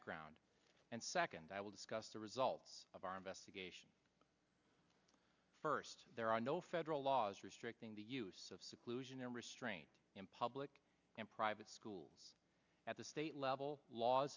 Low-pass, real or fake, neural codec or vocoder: 7.2 kHz; real; none